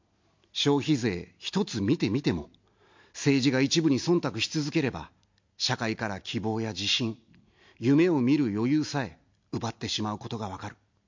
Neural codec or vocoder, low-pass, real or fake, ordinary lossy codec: none; 7.2 kHz; real; MP3, 48 kbps